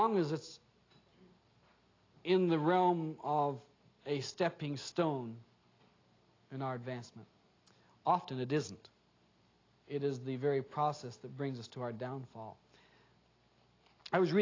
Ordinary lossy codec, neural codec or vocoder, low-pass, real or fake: AAC, 32 kbps; none; 7.2 kHz; real